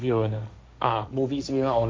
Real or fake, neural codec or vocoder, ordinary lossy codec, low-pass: fake; codec, 16 kHz, 1.1 kbps, Voila-Tokenizer; none; none